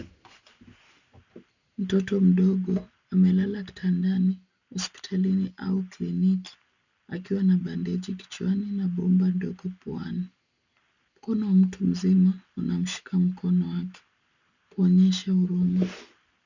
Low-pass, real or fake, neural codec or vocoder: 7.2 kHz; real; none